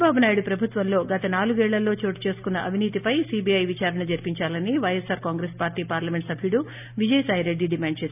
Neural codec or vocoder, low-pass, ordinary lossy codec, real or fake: none; 3.6 kHz; none; real